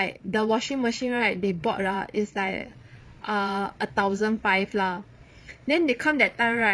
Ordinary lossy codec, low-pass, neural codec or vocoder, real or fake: none; none; vocoder, 22.05 kHz, 80 mel bands, Vocos; fake